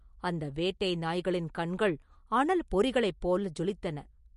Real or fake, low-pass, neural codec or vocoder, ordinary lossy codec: real; 14.4 kHz; none; MP3, 48 kbps